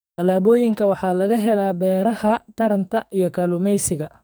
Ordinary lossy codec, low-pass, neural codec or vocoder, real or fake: none; none; codec, 44.1 kHz, 2.6 kbps, SNAC; fake